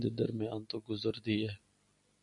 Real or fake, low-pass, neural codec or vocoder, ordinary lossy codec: real; 10.8 kHz; none; MP3, 96 kbps